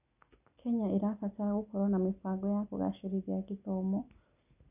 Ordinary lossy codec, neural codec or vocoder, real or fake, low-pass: none; none; real; 3.6 kHz